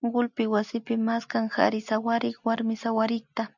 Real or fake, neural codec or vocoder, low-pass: real; none; 7.2 kHz